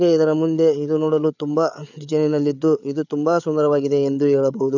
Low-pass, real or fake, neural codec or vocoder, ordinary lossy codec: 7.2 kHz; fake; codec, 16 kHz, 8 kbps, FreqCodec, larger model; none